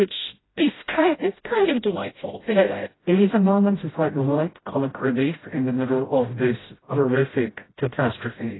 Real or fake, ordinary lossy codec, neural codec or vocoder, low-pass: fake; AAC, 16 kbps; codec, 16 kHz, 0.5 kbps, FreqCodec, smaller model; 7.2 kHz